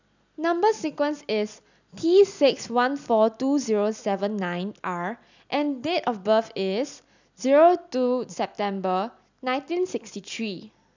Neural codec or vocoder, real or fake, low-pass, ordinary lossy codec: none; real; 7.2 kHz; none